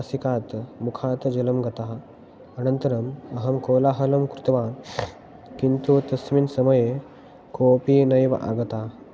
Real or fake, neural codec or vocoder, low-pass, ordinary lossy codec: real; none; none; none